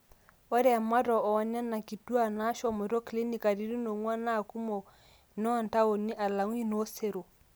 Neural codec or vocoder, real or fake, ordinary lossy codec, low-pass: none; real; none; none